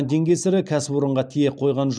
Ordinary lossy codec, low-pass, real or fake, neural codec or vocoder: none; 9.9 kHz; real; none